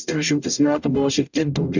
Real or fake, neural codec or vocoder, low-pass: fake; codec, 44.1 kHz, 0.9 kbps, DAC; 7.2 kHz